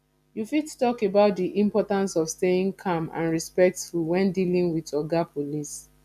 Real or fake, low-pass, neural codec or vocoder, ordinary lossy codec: real; 14.4 kHz; none; none